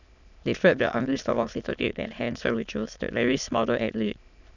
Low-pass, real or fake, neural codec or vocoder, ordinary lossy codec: 7.2 kHz; fake; autoencoder, 22.05 kHz, a latent of 192 numbers a frame, VITS, trained on many speakers; none